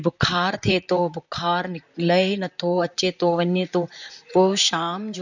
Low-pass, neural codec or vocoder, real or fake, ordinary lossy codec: 7.2 kHz; vocoder, 44.1 kHz, 128 mel bands, Pupu-Vocoder; fake; none